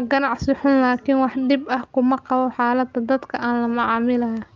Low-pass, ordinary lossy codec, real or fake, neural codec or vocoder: 7.2 kHz; Opus, 24 kbps; real; none